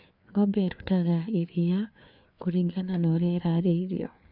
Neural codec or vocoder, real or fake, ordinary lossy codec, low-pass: codec, 16 kHz, 4 kbps, FreqCodec, larger model; fake; none; 5.4 kHz